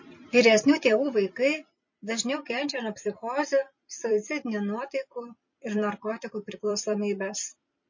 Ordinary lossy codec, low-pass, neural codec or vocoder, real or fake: MP3, 32 kbps; 7.2 kHz; vocoder, 44.1 kHz, 128 mel bands every 256 samples, BigVGAN v2; fake